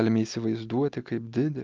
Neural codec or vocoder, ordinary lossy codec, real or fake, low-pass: none; Opus, 24 kbps; real; 7.2 kHz